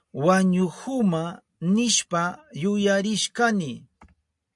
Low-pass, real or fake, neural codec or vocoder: 10.8 kHz; real; none